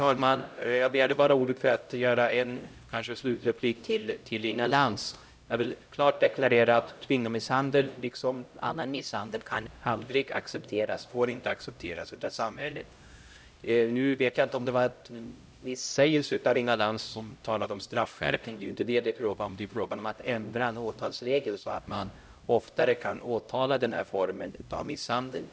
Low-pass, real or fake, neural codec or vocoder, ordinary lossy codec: none; fake; codec, 16 kHz, 0.5 kbps, X-Codec, HuBERT features, trained on LibriSpeech; none